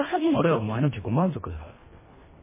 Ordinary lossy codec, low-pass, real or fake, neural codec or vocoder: MP3, 16 kbps; 3.6 kHz; fake; codec, 24 kHz, 1.5 kbps, HILCodec